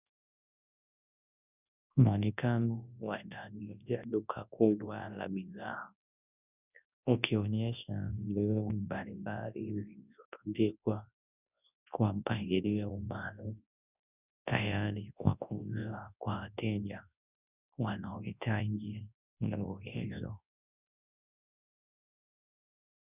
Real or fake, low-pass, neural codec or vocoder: fake; 3.6 kHz; codec, 24 kHz, 0.9 kbps, WavTokenizer, large speech release